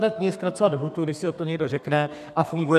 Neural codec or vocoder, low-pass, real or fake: codec, 32 kHz, 1.9 kbps, SNAC; 14.4 kHz; fake